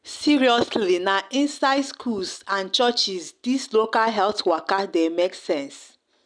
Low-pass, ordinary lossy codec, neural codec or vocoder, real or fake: 9.9 kHz; none; none; real